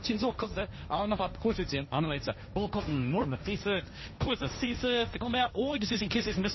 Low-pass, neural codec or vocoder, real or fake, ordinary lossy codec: 7.2 kHz; codec, 16 kHz, 1.1 kbps, Voila-Tokenizer; fake; MP3, 24 kbps